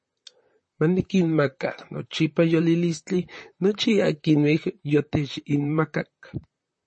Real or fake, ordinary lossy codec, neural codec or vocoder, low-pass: fake; MP3, 32 kbps; vocoder, 44.1 kHz, 128 mel bands, Pupu-Vocoder; 9.9 kHz